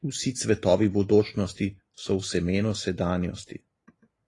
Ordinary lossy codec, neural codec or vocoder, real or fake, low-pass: AAC, 32 kbps; none; real; 10.8 kHz